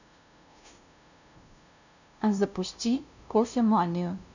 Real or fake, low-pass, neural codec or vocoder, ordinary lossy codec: fake; 7.2 kHz; codec, 16 kHz, 0.5 kbps, FunCodec, trained on LibriTTS, 25 frames a second; none